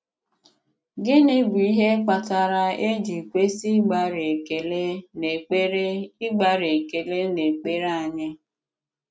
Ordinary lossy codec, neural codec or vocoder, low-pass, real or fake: none; none; none; real